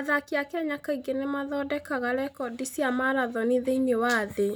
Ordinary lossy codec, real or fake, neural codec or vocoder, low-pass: none; real; none; none